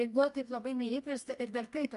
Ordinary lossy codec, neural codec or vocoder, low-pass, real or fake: AAC, 96 kbps; codec, 24 kHz, 0.9 kbps, WavTokenizer, medium music audio release; 10.8 kHz; fake